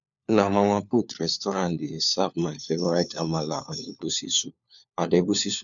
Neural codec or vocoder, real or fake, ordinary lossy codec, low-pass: codec, 16 kHz, 4 kbps, FunCodec, trained on LibriTTS, 50 frames a second; fake; none; 7.2 kHz